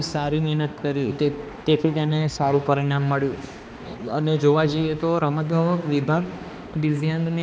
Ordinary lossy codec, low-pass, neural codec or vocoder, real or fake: none; none; codec, 16 kHz, 2 kbps, X-Codec, HuBERT features, trained on balanced general audio; fake